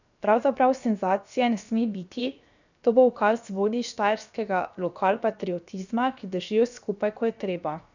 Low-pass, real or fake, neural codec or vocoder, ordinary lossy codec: 7.2 kHz; fake; codec, 16 kHz, 0.7 kbps, FocalCodec; none